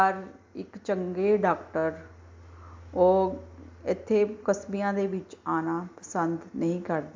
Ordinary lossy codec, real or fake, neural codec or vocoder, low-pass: MP3, 64 kbps; real; none; 7.2 kHz